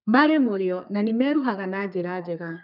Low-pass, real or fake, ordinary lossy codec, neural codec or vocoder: 5.4 kHz; fake; none; codec, 32 kHz, 1.9 kbps, SNAC